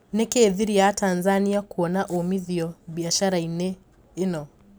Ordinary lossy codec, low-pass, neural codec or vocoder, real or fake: none; none; none; real